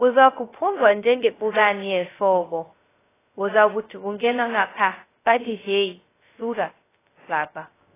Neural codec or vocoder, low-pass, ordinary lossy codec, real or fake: codec, 16 kHz, 0.2 kbps, FocalCodec; 3.6 kHz; AAC, 16 kbps; fake